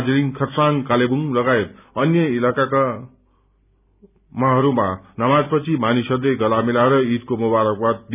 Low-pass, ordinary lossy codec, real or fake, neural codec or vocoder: 3.6 kHz; none; real; none